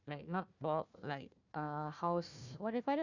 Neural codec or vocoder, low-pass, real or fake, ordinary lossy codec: codec, 16 kHz, 1 kbps, FunCodec, trained on Chinese and English, 50 frames a second; 7.2 kHz; fake; none